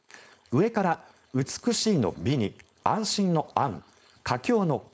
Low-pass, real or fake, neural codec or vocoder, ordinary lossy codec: none; fake; codec, 16 kHz, 4.8 kbps, FACodec; none